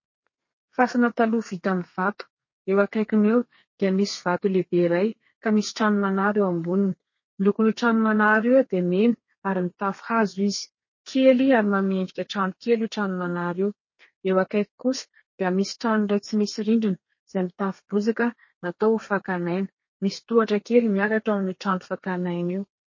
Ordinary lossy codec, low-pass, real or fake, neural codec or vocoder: MP3, 32 kbps; 7.2 kHz; fake; codec, 32 kHz, 1.9 kbps, SNAC